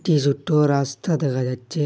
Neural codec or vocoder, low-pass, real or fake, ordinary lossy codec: none; none; real; none